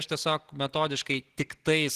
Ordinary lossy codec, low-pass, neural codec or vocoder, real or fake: Opus, 16 kbps; 14.4 kHz; none; real